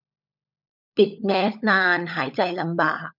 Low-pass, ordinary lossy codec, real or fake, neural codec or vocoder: 5.4 kHz; none; fake; codec, 16 kHz, 16 kbps, FunCodec, trained on LibriTTS, 50 frames a second